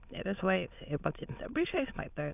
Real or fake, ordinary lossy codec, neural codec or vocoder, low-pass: fake; none; autoencoder, 22.05 kHz, a latent of 192 numbers a frame, VITS, trained on many speakers; 3.6 kHz